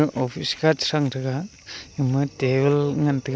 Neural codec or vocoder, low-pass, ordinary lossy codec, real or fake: none; none; none; real